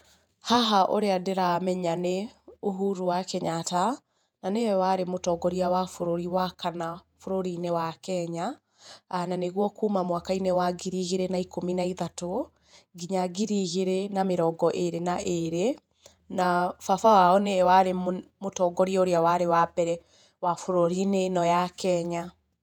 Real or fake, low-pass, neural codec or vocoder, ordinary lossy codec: fake; 19.8 kHz; vocoder, 48 kHz, 128 mel bands, Vocos; none